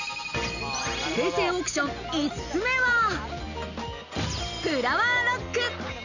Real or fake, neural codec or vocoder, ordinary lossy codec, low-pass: real; none; none; 7.2 kHz